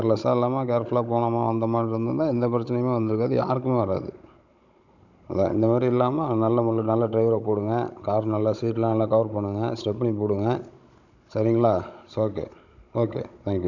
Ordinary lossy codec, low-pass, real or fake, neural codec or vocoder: none; 7.2 kHz; fake; codec, 16 kHz, 16 kbps, FunCodec, trained on Chinese and English, 50 frames a second